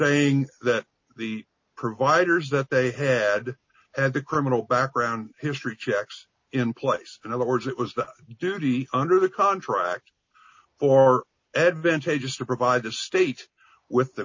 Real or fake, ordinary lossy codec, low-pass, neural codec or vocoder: real; MP3, 32 kbps; 7.2 kHz; none